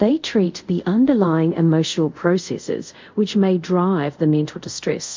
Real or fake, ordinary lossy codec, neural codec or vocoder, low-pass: fake; MP3, 64 kbps; codec, 24 kHz, 0.5 kbps, DualCodec; 7.2 kHz